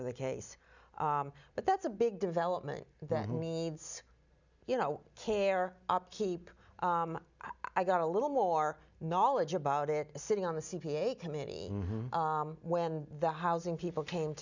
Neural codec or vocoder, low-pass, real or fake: none; 7.2 kHz; real